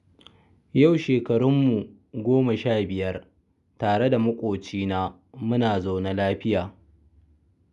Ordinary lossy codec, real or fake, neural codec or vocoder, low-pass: none; real; none; 10.8 kHz